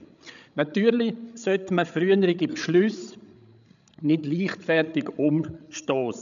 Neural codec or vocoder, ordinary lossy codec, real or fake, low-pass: codec, 16 kHz, 16 kbps, FreqCodec, larger model; none; fake; 7.2 kHz